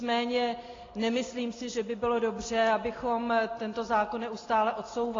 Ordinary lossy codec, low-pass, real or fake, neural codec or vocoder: AAC, 32 kbps; 7.2 kHz; real; none